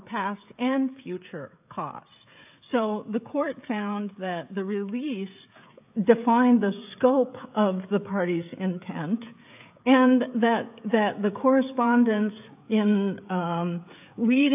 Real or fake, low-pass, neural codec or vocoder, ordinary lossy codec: fake; 3.6 kHz; codec, 16 kHz, 16 kbps, FreqCodec, smaller model; AAC, 32 kbps